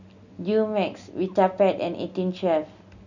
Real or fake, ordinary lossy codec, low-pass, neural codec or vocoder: real; none; 7.2 kHz; none